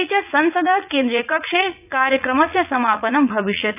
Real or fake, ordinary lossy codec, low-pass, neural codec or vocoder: fake; none; 3.6 kHz; vocoder, 44.1 kHz, 80 mel bands, Vocos